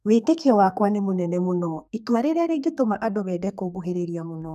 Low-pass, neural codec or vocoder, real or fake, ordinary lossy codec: 14.4 kHz; codec, 32 kHz, 1.9 kbps, SNAC; fake; none